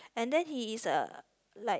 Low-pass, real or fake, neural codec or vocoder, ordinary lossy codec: none; real; none; none